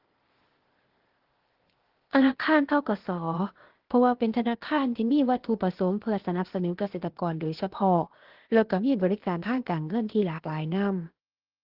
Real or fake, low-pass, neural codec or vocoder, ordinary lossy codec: fake; 5.4 kHz; codec, 16 kHz, 0.8 kbps, ZipCodec; Opus, 16 kbps